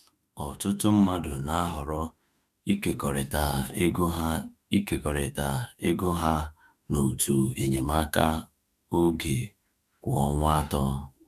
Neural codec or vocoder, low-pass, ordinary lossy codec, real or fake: autoencoder, 48 kHz, 32 numbers a frame, DAC-VAE, trained on Japanese speech; 14.4 kHz; none; fake